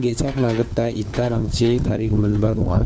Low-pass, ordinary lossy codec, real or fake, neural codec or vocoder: none; none; fake; codec, 16 kHz, 4 kbps, FunCodec, trained on LibriTTS, 50 frames a second